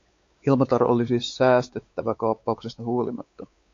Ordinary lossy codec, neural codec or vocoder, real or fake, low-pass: AAC, 48 kbps; codec, 16 kHz, 4 kbps, X-Codec, WavLM features, trained on Multilingual LibriSpeech; fake; 7.2 kHz